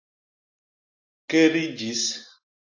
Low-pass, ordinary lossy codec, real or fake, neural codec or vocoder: 7.2 kHz; AAC, 48 kbps; real; none